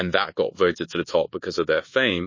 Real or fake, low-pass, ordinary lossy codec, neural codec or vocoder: fake; 7.2 kHz; MP3, 32 kbps; codec, 24 kHz, 1.2 kbps, DualCodec